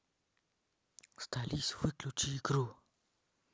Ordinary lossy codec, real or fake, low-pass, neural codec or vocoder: none; real; none; none